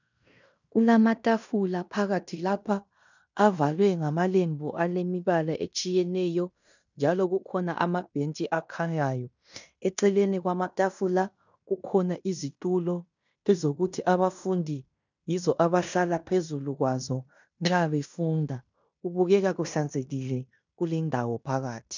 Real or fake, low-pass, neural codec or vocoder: fake; 7.2 kHz; codec, 16 kHz in and 24 kHz out, 0.9 kbps, LongCat-Audio-Codec, fine tuned four codebook decoder